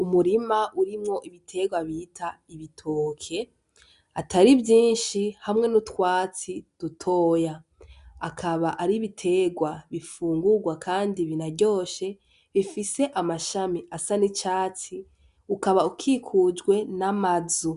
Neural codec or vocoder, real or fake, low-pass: none; real; 10.8 kHz